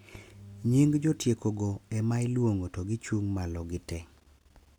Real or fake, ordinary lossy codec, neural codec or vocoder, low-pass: real; none; none; 19.8 kHz